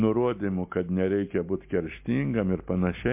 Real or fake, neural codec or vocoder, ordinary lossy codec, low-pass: real; none; MP3, 32 kbps; 3.6 kHz